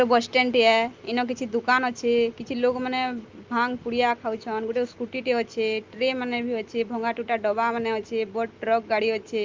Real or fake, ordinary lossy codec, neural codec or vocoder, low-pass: real; Opus, 32 kbps; none; 7.2 kHz